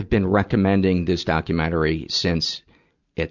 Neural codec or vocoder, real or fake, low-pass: none; real; 7.2 kHz